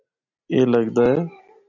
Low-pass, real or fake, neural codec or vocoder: 7.2 kHz; real; none